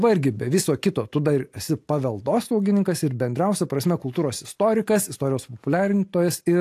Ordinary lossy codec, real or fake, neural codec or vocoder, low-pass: AAC, 64 kbps; real; none; 14.4 kHz